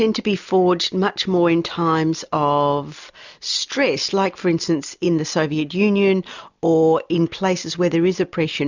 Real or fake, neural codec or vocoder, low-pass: real; none; 7.2 kHz